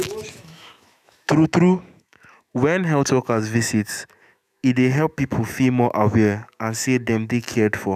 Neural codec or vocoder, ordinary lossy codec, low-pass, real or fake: autoencoder, 48 kHz, 128 numbers a frame, DAC-VAE, trained on Japanese speech; none; 14.4 kHz; fake